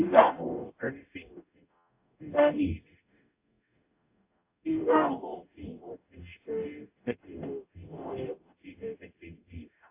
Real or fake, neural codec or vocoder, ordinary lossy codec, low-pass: fake; codec, 44.1 kHz, 0.9 kbps, DAC; AAC, 24 kbps; 3.6 kHz